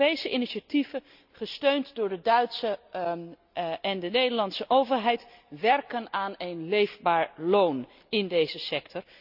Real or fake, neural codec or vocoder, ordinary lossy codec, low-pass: real; none; none; 5.4 kHz